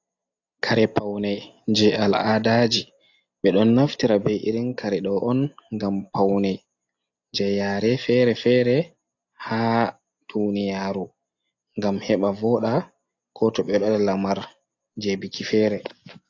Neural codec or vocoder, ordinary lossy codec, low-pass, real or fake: none; AAC, 48 kbps; 7.2 kHz; real